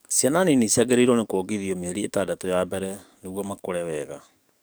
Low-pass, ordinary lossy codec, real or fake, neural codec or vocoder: none; none; fake; codec, 44.1 kHz, 7.8 kbps, DAC